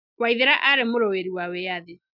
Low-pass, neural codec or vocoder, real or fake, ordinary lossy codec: 5.4 kHz; none; real; none